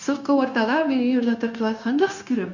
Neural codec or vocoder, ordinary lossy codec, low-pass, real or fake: codec, 16 kHz, 0.9 kbps, LongCat-Audio-Codec; none; 7.2 kHz; fake